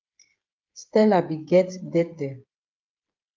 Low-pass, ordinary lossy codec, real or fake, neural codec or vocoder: 7.2 kHz; Opus, 24 kbps; fake; codec, 16 kHz, 8 kbps, FreqCodec, smaller model